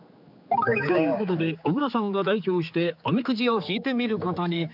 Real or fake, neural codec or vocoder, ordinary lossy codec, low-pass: fake; codec, 16 kHz, 4 kbps, X-Codec, HuBERT features, trained on general audio; none; 5.4 kHz